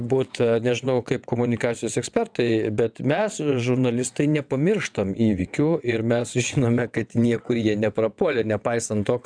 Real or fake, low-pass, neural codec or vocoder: fake; 9.9 kHz; vocoder, 22.05 kHz, 80 mel bands, WaveNeXt